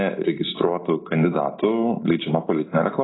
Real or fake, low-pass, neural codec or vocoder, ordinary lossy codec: real; 7.2 kHz; none; AAC, 16 kbps